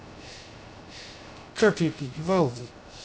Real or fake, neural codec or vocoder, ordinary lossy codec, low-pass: fake; codec, 16 kHz, 0.3 kbps, FocalCodec; none; none